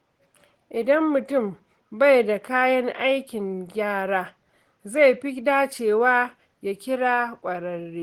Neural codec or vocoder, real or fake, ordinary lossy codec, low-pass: none; real; Opus, 16 kbps; 19.8 kHz